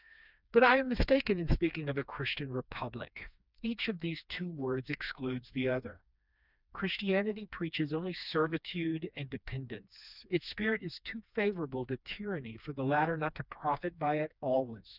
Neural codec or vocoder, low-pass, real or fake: codec, 16 kHz, 2 kbps, FreqCodec, smaller model; 5.4 kHz; fake